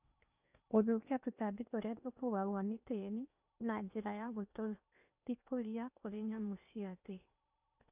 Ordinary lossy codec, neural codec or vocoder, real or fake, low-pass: none; codec, 16 kHz in and 24 kHz out, 0.8 kbps, FocalCodec, streaming, 65536 codes; fake; 3.6 kHz